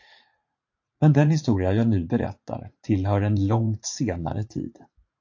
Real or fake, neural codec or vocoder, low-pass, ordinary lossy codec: fake; vocoder, 44.1 kHz, 80 mel bands, Vocos; 7.2 kHz; AAC, 48 kbps